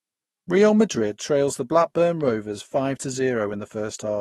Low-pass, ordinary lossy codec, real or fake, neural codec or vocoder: 19.8 kHz; AAC, 32 kbps; fake; autoencoder, 48 kHz, 128 numbers a frame, DAC-VAE, trained on Japanese speech